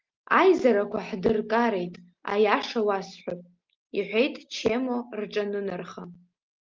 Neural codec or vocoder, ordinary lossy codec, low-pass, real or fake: none; Opus, 32 kbps; 7.2 kHz; real